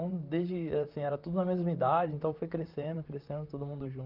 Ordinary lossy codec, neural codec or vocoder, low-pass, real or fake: Opus, 32 kbps; vocoder, 44.1 kHz, 128 mel bands, Pupu-Vocoder; 5.4 kHz; fake